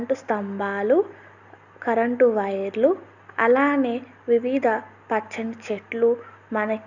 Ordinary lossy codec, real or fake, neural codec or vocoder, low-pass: none; real; none; 7.2 kHz